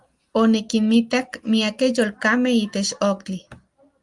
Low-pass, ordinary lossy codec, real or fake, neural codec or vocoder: 10.8 kHz; Opus, 32 kbps; real; none